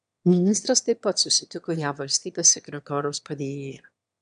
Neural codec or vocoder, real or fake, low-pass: autoencoder, 22.05 kHz, a latent of 192 numbers a frame, VITS, trained on one speaker; fake; 9.9 kHz